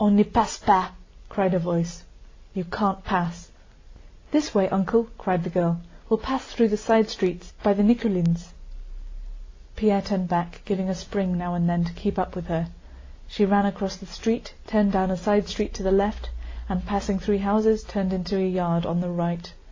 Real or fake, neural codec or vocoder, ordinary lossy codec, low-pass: real; none; AAC, 32 kbps; 7.2 kHz